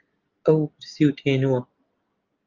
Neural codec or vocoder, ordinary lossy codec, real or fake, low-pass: none; Opus, 24 kbps; real; 7.2 kHz